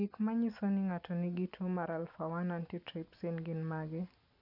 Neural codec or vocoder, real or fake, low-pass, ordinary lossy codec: none; real; 5.4 kHz; none